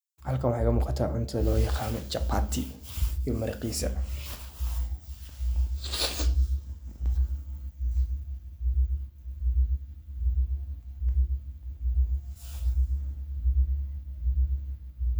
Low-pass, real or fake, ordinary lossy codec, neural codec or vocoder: none; real; none; none